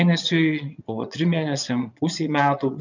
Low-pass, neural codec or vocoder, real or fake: 7.2 kHz; vocoder, 22.05 kHz, 80 mel bands, Vocos; fake